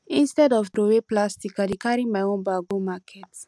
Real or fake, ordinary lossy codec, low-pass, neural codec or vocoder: real; none; none; none